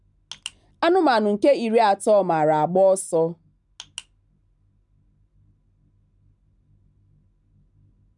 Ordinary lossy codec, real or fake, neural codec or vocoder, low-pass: none; real; none; 10.8 kHz